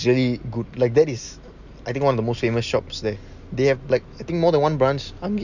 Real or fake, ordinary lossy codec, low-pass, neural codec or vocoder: real; none; 7.2 kHz; none